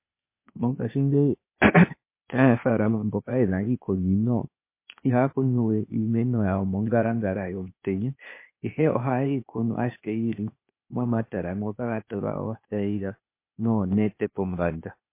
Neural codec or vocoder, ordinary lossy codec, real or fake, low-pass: codec, 16 kHz, 0.8 kbps, ZipCodec; MP3, 24 kbps; fake; 3.6 kHz